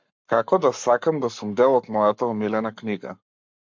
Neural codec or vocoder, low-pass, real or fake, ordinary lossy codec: codec, 44.1 kHz, 7.8 kbps, Pupu-Codec; 7.2 kHz; fake; MP3, 64 kbps